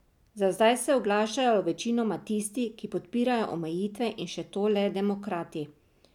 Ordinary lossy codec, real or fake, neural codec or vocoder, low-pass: none; real; none; 19.8 kHz